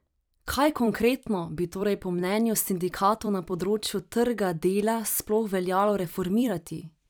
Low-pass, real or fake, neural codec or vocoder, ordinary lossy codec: none; fake; vocoder, 44.1 kHz, 128 mel bands every 256 samples, BigVGAN v2; none